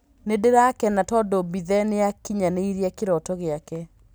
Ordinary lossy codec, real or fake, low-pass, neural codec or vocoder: none; real; none; none